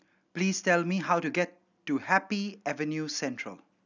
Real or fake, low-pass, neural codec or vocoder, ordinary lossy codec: real; 7.2 kHz; none; none